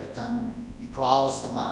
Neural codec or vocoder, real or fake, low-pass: codec, 24 kHz, 0.9 kbps, WavTokenizer, large speech release; fake; 10.8 kHz